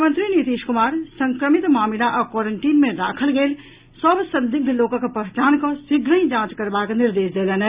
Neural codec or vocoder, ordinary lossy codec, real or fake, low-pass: none; Opus, 64 kbps; real; 3.6 kHz